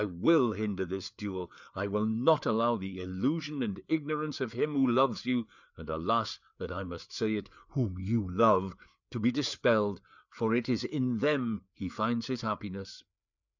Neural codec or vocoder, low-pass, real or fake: codec, 16 kHz, 4 kbps, FreqCodec, larger model; 7.2 kHz; fake